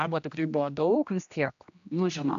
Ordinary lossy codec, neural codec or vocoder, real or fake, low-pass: AAC, 64 kbps; codec, 16 kHz, 1 kbps, X-Codec, HuBERT features, trained on general audio; fake; 7.2 kHz